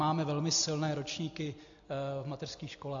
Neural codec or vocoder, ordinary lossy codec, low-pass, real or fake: none; AAC, 64 kbps; 7.2 kHz; real